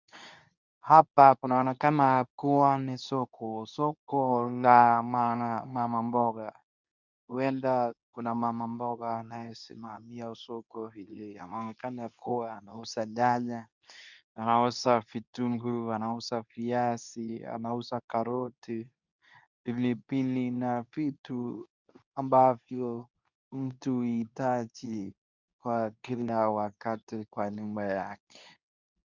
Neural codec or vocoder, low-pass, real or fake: codec, 24 kHz, 0.9 kbps, WavTokenizer, medium speech release version 2; 7.2 kHz; fake